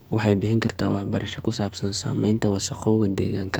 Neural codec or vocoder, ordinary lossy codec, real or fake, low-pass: codec, 44.1 kHz, 2.6 kbps, SNAC; none; fake; none